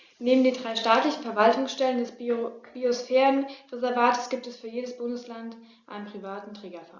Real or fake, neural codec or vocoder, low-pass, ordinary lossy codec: real; none; 7.2 kHz; Opus, 64 kbps